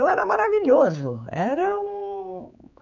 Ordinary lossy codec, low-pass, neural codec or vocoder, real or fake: none; 7.2 kHz; codec, 16 kHz, 4 kbps, X-Codec, HuBERT features, trained on general audio; fake